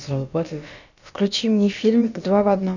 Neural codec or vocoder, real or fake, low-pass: codec, 16 kHz, about 1 kbps, DyCAST, with the encoder's durations; fake; 7.2 kHz